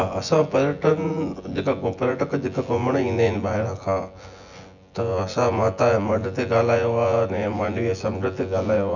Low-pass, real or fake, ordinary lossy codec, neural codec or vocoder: 7.2 kHz; fake; none; vocoder, 24 kHz, 100 mel bands, Vocos